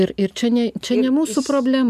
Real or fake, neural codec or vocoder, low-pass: real; none; 14.4 kHz